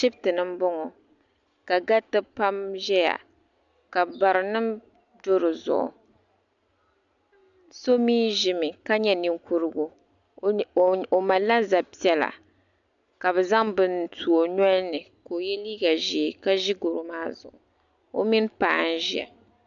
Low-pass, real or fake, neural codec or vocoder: 7.2 kHz; real; none